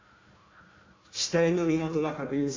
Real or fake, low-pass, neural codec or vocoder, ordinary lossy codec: fake; 7.2 kHz; codec, 16 kHz, 1 kbps, FunCodec, trained on Chinese and English, 50 frames a second; AAC, 32 kbps